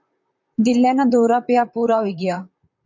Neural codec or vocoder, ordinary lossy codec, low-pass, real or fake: vocoder, 44.1 kHz, 128 mel bands, Pupu-Vocoder; MP3, 48 kbps; 7.2 kHz; fake